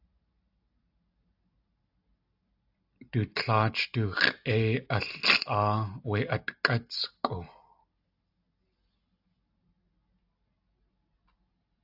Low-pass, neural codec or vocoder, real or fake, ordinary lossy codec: 5.4 kHz; none; real; AAC, 48 kbps